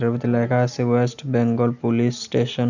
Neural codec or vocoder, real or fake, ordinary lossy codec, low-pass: none; real; none; 7.2 kHz